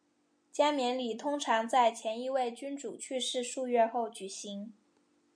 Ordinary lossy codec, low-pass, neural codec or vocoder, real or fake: MP3, 64 kbps; 9.9 kHz; none; real